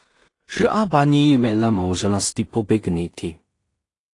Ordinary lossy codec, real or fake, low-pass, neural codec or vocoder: AAC, 48 kbps; fake; 10.8 kHz; codec, 16 kHz in and 24 kHz out, 0.4 kbps, LongCat-Audio-Codec, two codebook decoder